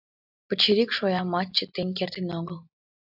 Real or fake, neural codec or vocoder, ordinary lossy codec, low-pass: real; none; AAC, 48 kbps; 5.4 kHz